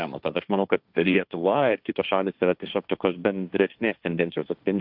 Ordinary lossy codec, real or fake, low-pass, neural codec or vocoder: Opus, 64 kbps; fake; 5.4 kHz; codec, 16 kHz, 1.1 kbps, Voila-Tokenizer